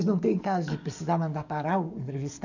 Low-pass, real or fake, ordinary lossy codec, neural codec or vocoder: 7.2 kHz; fake; none; codec, 44.1 kHz, 7.8 kbps, DAC